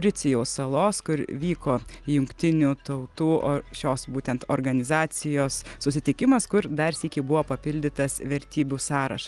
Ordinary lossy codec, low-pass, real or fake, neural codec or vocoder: Opus, 24 kbps; 10.8 kHz; real; none